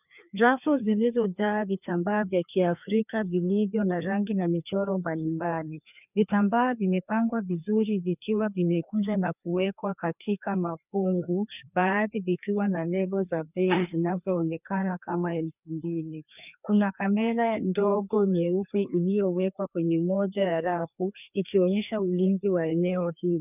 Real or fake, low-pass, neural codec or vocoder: fake; 3.6 kHz; codec, 16 kHz, 2 kbps, FreqCodec, larger model